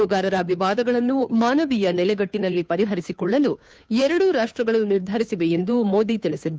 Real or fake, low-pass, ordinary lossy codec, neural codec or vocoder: fake; none; none; codec, 16 kHz, 2 kbps, FunCodec, trained on Chinese and English, 25 frames a second